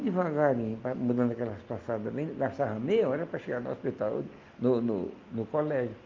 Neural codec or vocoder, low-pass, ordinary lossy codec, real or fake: none; 7.2 kHz; Opus, 24 kbps; real